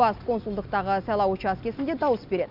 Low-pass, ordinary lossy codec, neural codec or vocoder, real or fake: 5.4 kHz; Opus, 64 kbps; none; real